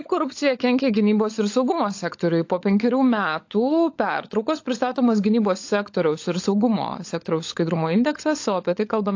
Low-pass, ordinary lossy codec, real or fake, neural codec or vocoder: 7.2 kHz; AAC, 48 kbps; fake; codec, 16 kHz, 16 kbps, FunCodec, trained on LibriTTS, 50 frames a second